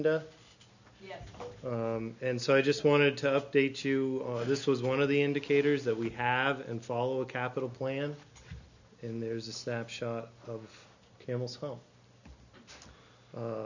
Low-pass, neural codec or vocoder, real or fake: 7.2 kHz; none; real